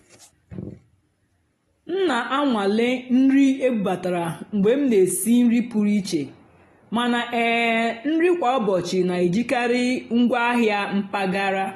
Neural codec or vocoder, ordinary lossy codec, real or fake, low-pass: none; AAC, 32 kbps; real; 19.8 kHz